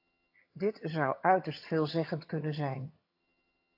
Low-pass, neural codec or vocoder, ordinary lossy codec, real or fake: 5.4 kHz; vocoder, 22.05 kHz, 80 mel bands, HiFi-GAN; AAC, 32 kbps; fake